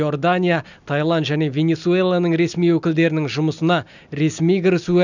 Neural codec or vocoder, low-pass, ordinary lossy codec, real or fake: none; 7.2 kHz; none; real